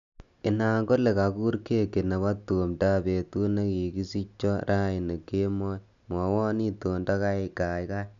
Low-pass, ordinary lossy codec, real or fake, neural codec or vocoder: 7.2 kHz; none; real; none